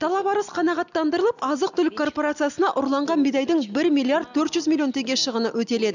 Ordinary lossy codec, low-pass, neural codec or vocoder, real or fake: none; 7.2 kHz; none; real